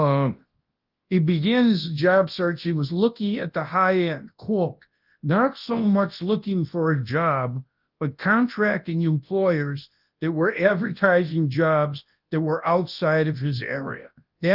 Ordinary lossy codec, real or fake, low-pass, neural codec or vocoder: Opus, 32 kbps; fake; 5.4 kHz; codec, 24 kHz, 0.9 kbps, WavTokenizer, large speech release